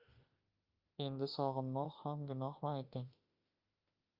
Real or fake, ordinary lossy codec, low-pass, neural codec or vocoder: fake; Opus, 32 kbps; 5.4 kHz; autoencoder, 48 kHz, 32 numbers a frame, DAC-VAE, trained on Japanese speech